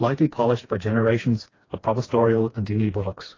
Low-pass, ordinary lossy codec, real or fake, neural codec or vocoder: 7.2 kHz; AAC, 32 kbps; fake; codec, 16 kHz, 2 kbps, FreqCodec, smaller model